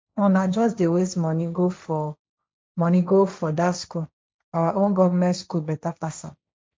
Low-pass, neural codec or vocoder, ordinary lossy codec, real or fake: none; codec, 16 kHz, 1.1 kbps, Voila-Tokenizer; none; fake